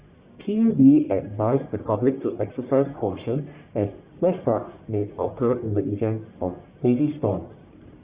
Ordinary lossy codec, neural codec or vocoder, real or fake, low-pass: Opus, 64 kbps; codec, 44.1 kHz, 1.7 kbps, Pupu-Codec; fake; 3.6 kHz